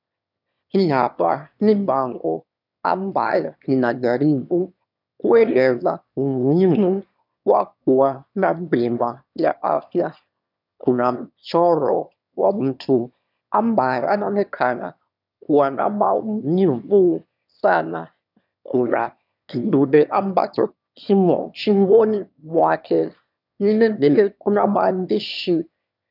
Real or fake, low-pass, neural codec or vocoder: fake; 5.4 kHz; autoencoder, 22.05 kHz, a latent of 192 numbers a frame, VITS, trained on one speaker